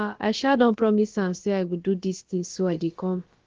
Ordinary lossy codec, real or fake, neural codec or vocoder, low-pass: Opus, 16 kbps; fake; codec, 16 kHz, about 1 kbps, DyCAST, with the encoder's durations; 7.2 kHz